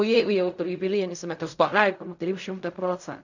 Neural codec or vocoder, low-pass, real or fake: codec, 16 kHz in and 24 kHz out, 0.4 kbps, LongCat-Audio-Codec, fine tuned four codebook decoder; 7.2 kHz; fake